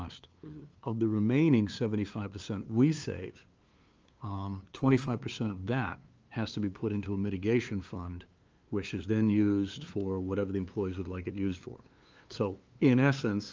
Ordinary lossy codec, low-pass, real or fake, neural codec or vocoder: Opus, 24 kbps; 7.2 kHz; fake; codec, 16 kHz, 2 kbps, FunCodec, trained on LibriTTS, 25 frames a second